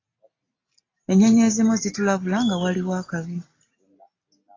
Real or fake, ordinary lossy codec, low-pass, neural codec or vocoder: real; AAC, 32 kbps; 7.2 kHz; none